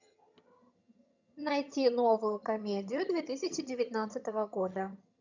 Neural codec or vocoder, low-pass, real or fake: vocoder, 22.05 kHz, 80 mel bands, HiFi-GAN; 7.2 kHz; fake